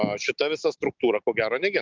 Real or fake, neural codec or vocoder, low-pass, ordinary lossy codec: real; none; 7.2 kHz; Opus, 24 kbps